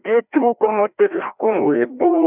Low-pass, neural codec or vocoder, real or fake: 3.6 kHz; codec, 16 kHz, 1 kbps, FreqCodec, larger model; fake